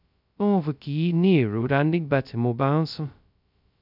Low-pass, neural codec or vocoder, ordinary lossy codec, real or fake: 5.4 kHz; codec, 16 kHz, 0.2 kbps, FocalCodec; none; fake